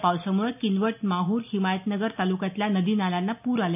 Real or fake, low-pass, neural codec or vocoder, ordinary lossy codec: real; 3.6 kHz; none; none